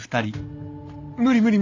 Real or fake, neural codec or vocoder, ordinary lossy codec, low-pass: real; none; AAC, 48 kbps; 7.2 kHz